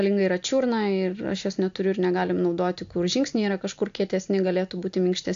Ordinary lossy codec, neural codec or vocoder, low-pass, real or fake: AAC, 64 kbps; none; 7.2 kHz; real